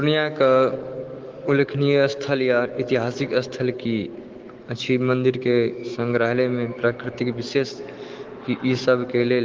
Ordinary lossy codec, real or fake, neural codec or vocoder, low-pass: Opus, 16 kbps; fake; autoencoder, 48 kHz, 128 numbers a frame, DAC-VAE, trained on Japanese speech; 7.2 kHz